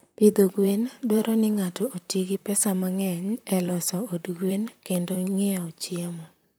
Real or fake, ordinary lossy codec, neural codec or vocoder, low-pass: fake; none; vocoder, 44.1 kHz, 128 mel bands, Pupu-Vocoder; none